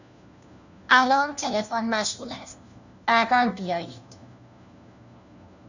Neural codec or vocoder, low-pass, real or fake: codec, 16 kHz, 1 kbps, FunCodec, trained on LibriTTS, 50 frames a second; 7.2 kHz; fake